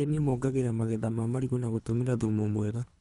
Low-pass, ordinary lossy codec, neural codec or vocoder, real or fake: 10.8 kHz; none; codec, 24 kHz, 3 kbps, HILCodec; fake